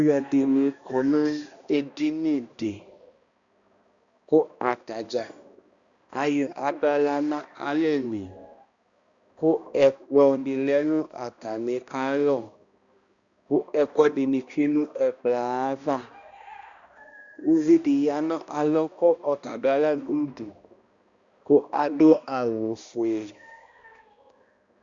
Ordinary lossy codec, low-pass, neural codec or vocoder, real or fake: Opus, 64 kbps; 7.2 kHz; codec, 16 kHz, 1 kbps, X-Codec, HuBERT features, trained on balanced general audio; fake